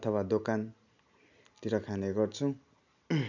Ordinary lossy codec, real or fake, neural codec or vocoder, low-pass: none; real; none; 7.2 kHz